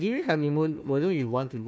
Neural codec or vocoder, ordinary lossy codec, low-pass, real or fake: codec, 16 kHz, 1 kbps, FunCodec, trained on Chinese and English, 50 frames a second; none; none; fake